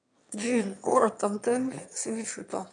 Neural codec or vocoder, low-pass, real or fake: autoencoder, 22.05 kHz, a latent of 192 numbers a frame, VITS, trained on one speaker; 9.9 kHz; fake